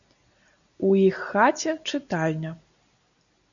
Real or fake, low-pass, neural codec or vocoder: real; 7.2 kHz; none